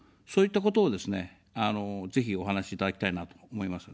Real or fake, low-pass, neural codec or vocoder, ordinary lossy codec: real; none; none; none